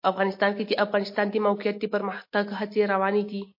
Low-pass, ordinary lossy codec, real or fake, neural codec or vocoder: 5.4 kHz; MP3, 32 kbps; real; none